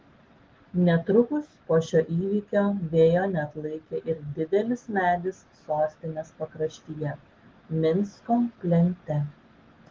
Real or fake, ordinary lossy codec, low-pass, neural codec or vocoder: real; Opus, 16 kbps; 7.2 kHz; none